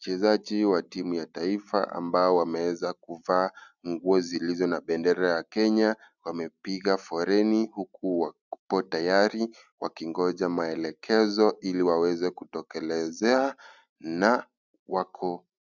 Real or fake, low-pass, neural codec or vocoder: real; 7.2 kHz; none